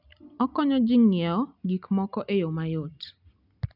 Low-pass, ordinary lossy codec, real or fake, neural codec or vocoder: 5.4 kHz; none; real; none